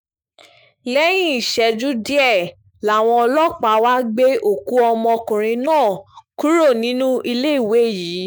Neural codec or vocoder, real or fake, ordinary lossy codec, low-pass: autoencoder, 48 kHz, 128 numbers a frame, DAC-VAE, trained on Japanese speech; fake; none; none